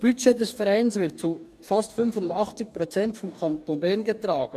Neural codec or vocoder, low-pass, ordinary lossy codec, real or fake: codec, 44.1 kHz, 2.6 kbps, DAC; 14.4 kHz; none; fake